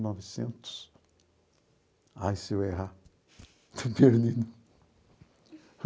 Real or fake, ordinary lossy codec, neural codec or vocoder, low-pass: real; none; none; none